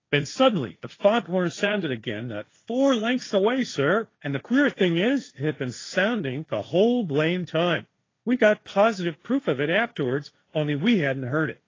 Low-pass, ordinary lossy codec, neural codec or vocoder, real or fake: 7.2 kHz; AAC, 32 kbps; codec, 16 kHz, 1.1 kbps, Voila-Tokenizer; fake